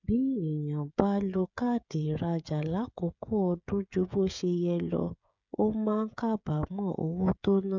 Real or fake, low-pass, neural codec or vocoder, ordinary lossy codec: fake; 7.2 kHz; codec, 16 kHz, 16 kbps, FreqCodec, smaller model; none